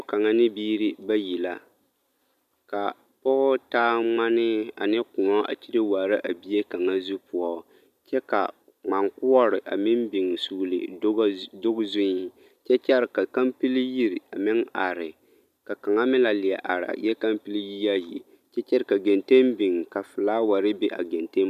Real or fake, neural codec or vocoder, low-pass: real; none; 14.4 kHz